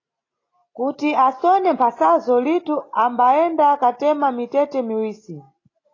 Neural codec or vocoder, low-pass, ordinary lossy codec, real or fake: none; 7.2 kHz; AAC, 32 kbps; real